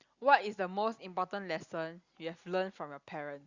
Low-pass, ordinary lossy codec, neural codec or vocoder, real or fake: 7.2 kHz; Opus, 64 kbps; none; real